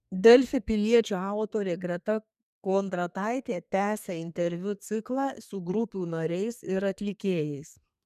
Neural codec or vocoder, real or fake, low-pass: codec, 32 kHz, 1.9 kbps, SNAC; fake; 14.4 kHz